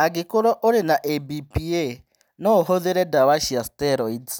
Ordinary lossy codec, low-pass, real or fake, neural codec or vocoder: none; none; real; none